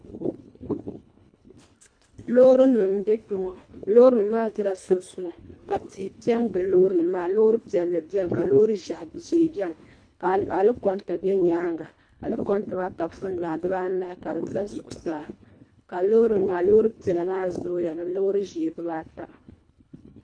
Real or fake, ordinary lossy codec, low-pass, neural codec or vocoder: fake; MP3, 64 kbps; 9.9 kHz; codec, 24 kHz, 1.5 kbps, HILCodec